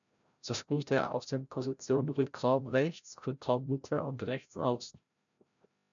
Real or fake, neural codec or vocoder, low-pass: fake; codec, 16 kHz, 0.5 kbps, FreqCodec, larger model; 7.2 kHz